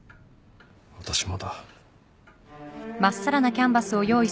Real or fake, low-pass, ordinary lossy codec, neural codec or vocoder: real; none; none; none